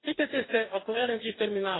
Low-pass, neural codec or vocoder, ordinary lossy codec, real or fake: 7.2 kHz; codec, 44.1 kHz, 2.6 kbps, DAC; AAC, 16 kbps; fake